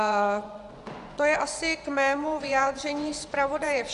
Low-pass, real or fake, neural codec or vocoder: 10.8 kHz; fake; vocoder, 24 kHz, 100 mel bands, Vocos